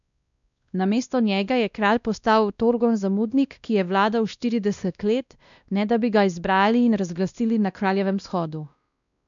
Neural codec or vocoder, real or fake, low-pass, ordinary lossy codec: codec, 16 kHz, 1 kbps, X-Codec, WavLM features, trained on Multilingual LibriSpeech; fake; 7.2 kHz; none